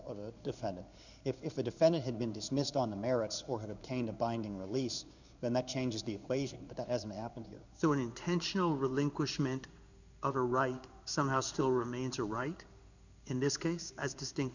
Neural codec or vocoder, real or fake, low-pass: codec, 16 kHz in and 24 kHz out, 1 kbps, XY-Tokenizer; fake; 7.2 kHz